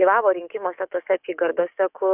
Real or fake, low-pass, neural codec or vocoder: fake; 3.6 kHz; codec, 44.1 kHz, 7.8 kbps, DAC